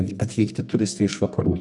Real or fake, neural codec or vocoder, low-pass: fake; codec, 24 kHz, 0.9 kbps, WavTokenizer, medium music audio release; 10.8 kHz